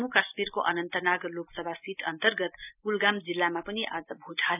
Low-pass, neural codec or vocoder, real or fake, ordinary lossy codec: 3.6 kHz; none; real; none